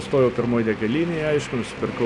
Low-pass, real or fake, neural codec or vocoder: 10.8 kHz; real; none